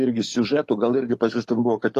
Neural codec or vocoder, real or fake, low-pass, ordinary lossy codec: codec, 44.1 kHz, 7.8 kbps, DAC; fake; 14.4 kHz; AAC, 48 kbps